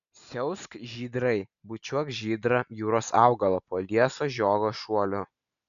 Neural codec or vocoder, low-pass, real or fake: none; 7.2 kHz; real